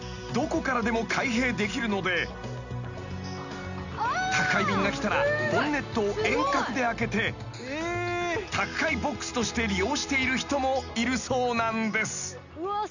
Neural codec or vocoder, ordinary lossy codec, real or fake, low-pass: none; none; real; 7.2 kHz